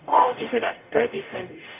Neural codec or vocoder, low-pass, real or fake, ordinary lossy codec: codec, 44.1 kHz, 0.9 kbps, DAC; 3.6 kHz; fake; none